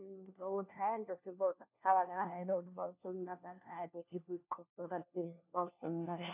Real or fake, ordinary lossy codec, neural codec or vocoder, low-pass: fake; none; codec, 16 kHz, 1 kbps, FunCodec, trained on LibriTTS, 50 frames a second; 3.6 kHz